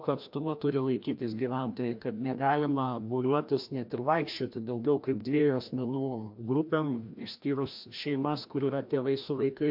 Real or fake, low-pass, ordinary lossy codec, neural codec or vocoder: fake; 5.4 kHz; MP3, 48 kbps; codec, 16 kHz, 1 kbps, FreqCodec, larger model